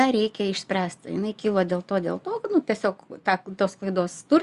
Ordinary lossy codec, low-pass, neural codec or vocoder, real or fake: Opus, 64 kbps; 10.8 kHz; vocoder, 24 kHz, 100 mel bands, Vocos; fake